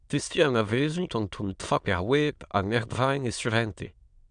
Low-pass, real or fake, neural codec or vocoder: 9.9 kHz; fake; autoencoder, 22.05 kHz, a latent of 192 numbers a frame, VITS, trained on many speakers